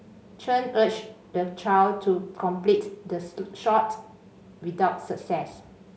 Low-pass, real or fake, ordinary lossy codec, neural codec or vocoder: none; real; none; none